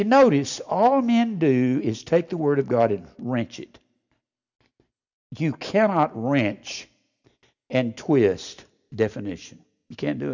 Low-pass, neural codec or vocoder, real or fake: 7.2 kHz; none; real